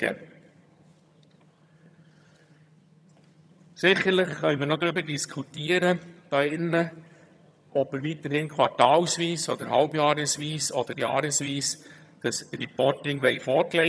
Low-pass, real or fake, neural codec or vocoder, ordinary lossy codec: none; fake; vocoder, 22.05 kHz, 80 mel bands, HiFi-GAN; none